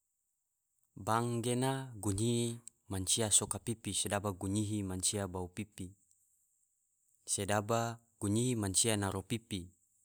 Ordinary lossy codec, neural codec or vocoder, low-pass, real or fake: none; none; none; real